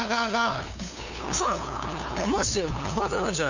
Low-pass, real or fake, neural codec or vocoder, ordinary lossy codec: 7.2 kHz; fake; codec, 16 kHz, 2 kbps, FunCodec, trained on LibriTTS, 25 frames a second; none